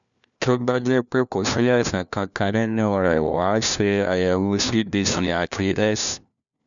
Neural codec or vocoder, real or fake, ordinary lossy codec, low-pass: codec, 16 kHz, 1 kbps, FunCodec, trained on LibriTTS, 50 frames a second; fake; MP3, 96 kbps; 7.2 kHz